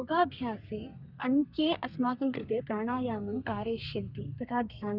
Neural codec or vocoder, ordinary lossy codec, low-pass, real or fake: codec, 32 kHz, 1.9 kbps, SNAC; Opus, 64 kbps; 5.4 kHz; fake